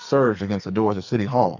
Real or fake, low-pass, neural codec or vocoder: fake; 7.2 kHz; codec, 44.1 kHz, 2.6 kbps, SNAC